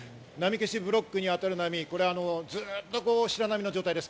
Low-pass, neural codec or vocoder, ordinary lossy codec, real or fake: none; none; none; real